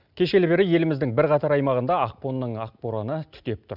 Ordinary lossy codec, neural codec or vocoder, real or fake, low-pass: none; none; real; 5.4 kHz